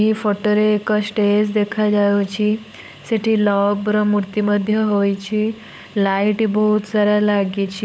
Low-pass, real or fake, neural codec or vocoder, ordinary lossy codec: none; fake; codec, 16 kHz, 16 kbps, FunCodec, trained on LibriTTS, 50 frames a second; none